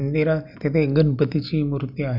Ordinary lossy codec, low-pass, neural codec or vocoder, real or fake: none; 5.4 kHz; none; real